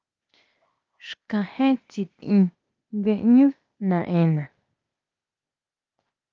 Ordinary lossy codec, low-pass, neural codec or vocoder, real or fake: Opus, 24 kbps; 7.2 kHz; codec, 16 kHz, 0.8 kbps, ZipCodec; fake